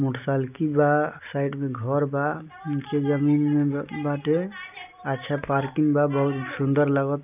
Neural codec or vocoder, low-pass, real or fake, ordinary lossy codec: none; 3.6 kHz; real; none